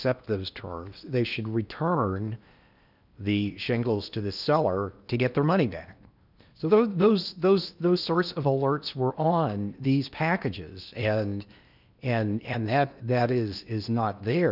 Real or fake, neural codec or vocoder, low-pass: fake; codec, 16 kHz in and 24 kHz out, 0.8 kbps, FocalCodec, streaming, 65536 codes; 5.4 kHz